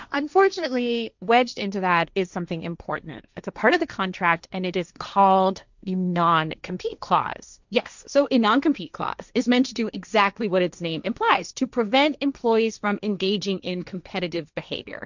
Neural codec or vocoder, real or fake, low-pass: codec, 16 kHz, 1.1 kbps, Voila-Tokenizer; fake; 7.2 kHz